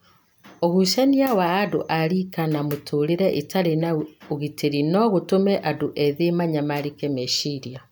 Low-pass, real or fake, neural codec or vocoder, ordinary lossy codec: none; real; none; none